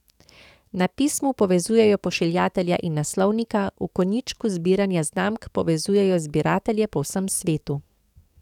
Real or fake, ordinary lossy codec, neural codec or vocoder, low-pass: fake; none; codec, 44.1 kHz, 7.8 kbps, DAC; 19.8 kHz